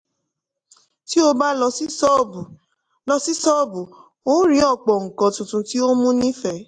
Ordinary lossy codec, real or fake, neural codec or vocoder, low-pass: AAC, 64 kbps; real; none; 9.9 kHz